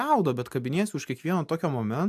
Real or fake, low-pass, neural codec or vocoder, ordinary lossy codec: real; 14.4 kHz; none; AAC, 96 kbps